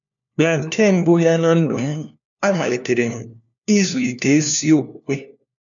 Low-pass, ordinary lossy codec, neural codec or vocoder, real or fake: 7.2 kHz; none; codec, 16 kHz, 1 kbps, FunCodec, trained on LibriTTS, 50 frames a second; fake